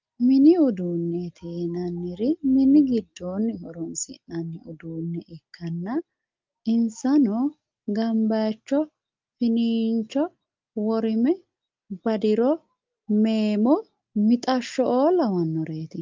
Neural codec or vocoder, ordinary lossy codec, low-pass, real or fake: none; Opus, 24 kbps; 7.2 kHz; real